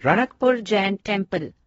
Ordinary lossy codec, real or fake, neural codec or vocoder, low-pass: AAC, 24 kbps; fake; codec, 16 kHz in and 24 kHz out, 0.8 kbps, FocalCodec, streaming, 65536 codes; 10.8 kHz